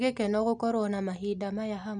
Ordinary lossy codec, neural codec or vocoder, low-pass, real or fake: none; none; 10.8 kHz; real